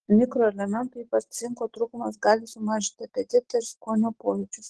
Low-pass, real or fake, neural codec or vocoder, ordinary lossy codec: 10.8 kHz; real; none; Opus, 16 kbps